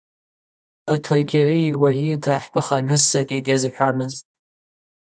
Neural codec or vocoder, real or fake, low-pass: codec, 24 kHz, 0.9 kbps, WavTokenizer, medium music audio release; fake; 9.9 kHz